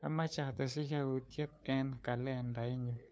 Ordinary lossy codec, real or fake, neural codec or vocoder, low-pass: none; fake; codec, 16 kHz, 2 kbps, FunCodec, trained on LibriTTS, 25 frames a second; none